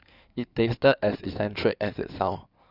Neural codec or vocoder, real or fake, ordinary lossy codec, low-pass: codec, 16 kHz in and 24 kHz out, 2.2 kbps, FireRedTTS-2 codec; fake; none; 5.4 kHz